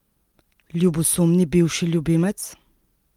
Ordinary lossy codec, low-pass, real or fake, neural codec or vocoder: Opus, 24 kbps; 19.8 kHz; real; none